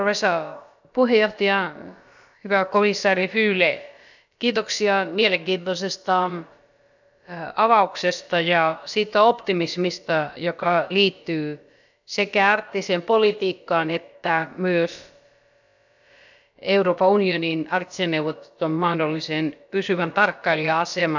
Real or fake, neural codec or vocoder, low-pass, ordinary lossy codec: fake; codec, 16 kHz, about 1 kbps, DyCAST, with the encoder's durations; 7.2 kHz; none